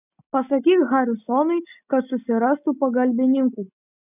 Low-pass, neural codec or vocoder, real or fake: 3.6 kHz; none; real